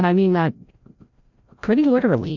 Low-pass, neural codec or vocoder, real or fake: 7.2 kHz; codec, 16 kHz, 0.5 kbps, FreqCodec, larger model; fake